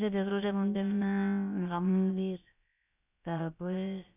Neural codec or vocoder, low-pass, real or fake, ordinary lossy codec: codec, 16 kHz, about 1 kbps, DyCAST, with the encoder's durations; 3.6 kHz; fake; none